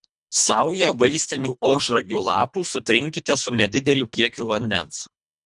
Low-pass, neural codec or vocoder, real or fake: 10.8 kHz; codec, 24 kHz, 1.5 kbps, HILCodec; fake